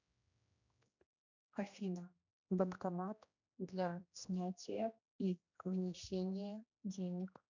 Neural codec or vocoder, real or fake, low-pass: codec, 16 kHz, 1 kbps, X-Codec, HuBERT features, trained on general audio; fake; 7.2 kHz